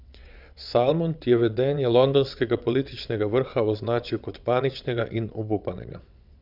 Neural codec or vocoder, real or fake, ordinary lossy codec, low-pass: vocoder, 22.05 kHz, 80 mel bands, WaveNeXt; fake; none; 5.4 kHz